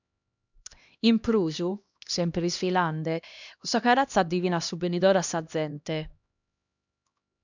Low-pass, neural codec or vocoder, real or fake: 7.2 kHz; codec, 16 kHz, 1 kbps, X-Codec, HuBERT features, trained on LibriSpeech; fake